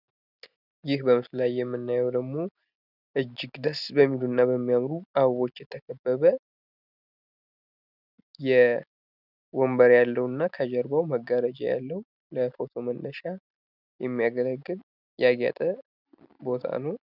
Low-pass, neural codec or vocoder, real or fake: 5.4 kHz; none; real